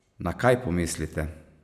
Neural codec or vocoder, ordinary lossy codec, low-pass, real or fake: none; none; 14.4 kHz; real